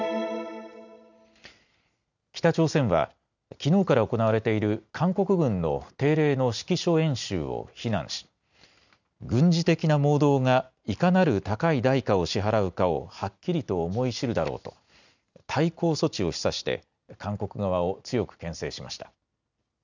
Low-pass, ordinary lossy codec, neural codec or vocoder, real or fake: 7.2 kHz; none; none; real